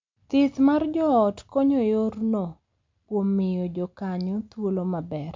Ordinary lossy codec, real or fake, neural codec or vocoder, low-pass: MP3, 64 kbps; real; none; 7.2 kHz